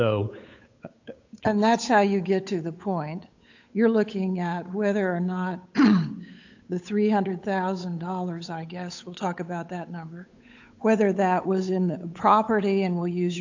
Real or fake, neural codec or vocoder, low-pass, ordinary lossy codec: fake; codec, 16 kHz, 8 kbps, FunCodec, trained on Chinese and English, 25 frames a second; 7.2 kHz; AAC, 48 kbps